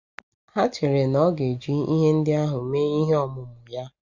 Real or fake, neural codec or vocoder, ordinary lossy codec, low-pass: real; none; Opus, 64 kbps; 7.2 kHz